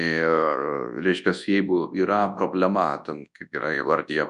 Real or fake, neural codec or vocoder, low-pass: fake; codec, 24 kHz, 0.9 kbps, WavTokenizer, large speech release; 10.8 kHz